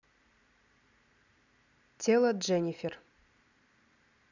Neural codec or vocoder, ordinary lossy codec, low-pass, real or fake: none; none; 7.2 kHz; real